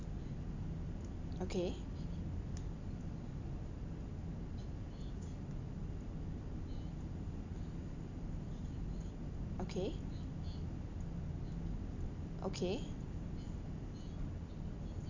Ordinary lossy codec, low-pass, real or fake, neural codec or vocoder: AAC, 48 kbps; 7.2 kHz; real; none